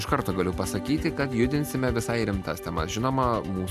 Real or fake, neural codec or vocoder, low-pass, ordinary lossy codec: real; none; 14.4 kHz; AAC, 96 kbps